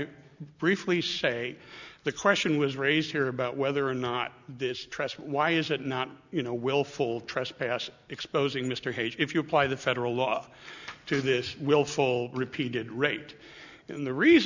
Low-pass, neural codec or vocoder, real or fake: 7.2 kHz; none; real